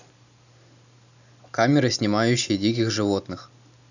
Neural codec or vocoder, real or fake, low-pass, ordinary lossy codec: none; real; 7.2 kHz; none